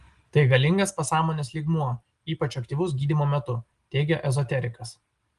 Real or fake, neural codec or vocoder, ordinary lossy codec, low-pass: real; none; Opus, 24 kbps; 10.8 kHz